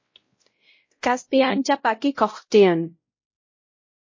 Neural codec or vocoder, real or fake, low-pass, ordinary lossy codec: codec, 16 kHz, 0.5 kbps, X-Codec, WavLM features, trained on Multilingual LibriSpeech; fake; 7.2 kHz; MP3, 32 kbps